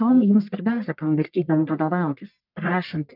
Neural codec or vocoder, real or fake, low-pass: codec, 44.1 kHz, 1.7 kbps, Pupu-Codec; fake; 5.4 kHz